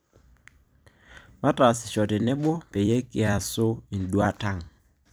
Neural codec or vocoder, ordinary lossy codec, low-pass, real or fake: vocoder, 44.1 kHz, 128 mel bands every 256 samples, BigVGAN v2; none; none; fake